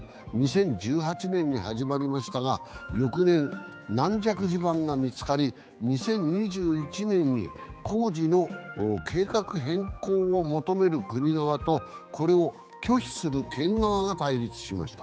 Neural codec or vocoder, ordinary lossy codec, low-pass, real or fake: codec, 16 kHz, 4 kbps, X-Codec, HuBERT features, trained on general audio; none; none; fake